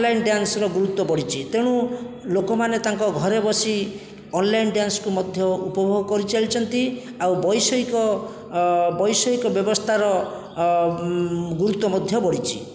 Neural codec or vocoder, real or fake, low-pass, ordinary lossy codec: none; real; none; none